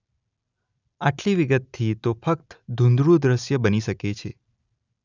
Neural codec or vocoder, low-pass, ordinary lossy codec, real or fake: none; 7.2 kHz; none; real